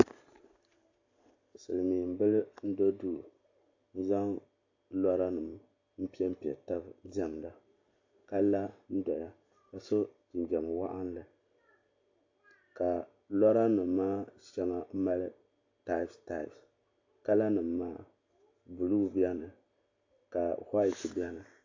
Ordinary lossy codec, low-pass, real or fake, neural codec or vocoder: AAC, 48 kbps; 7.2 kHz; real; none